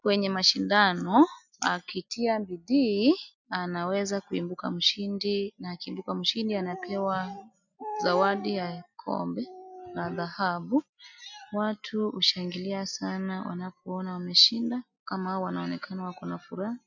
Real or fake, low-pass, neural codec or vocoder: real; 7.2 kHz; none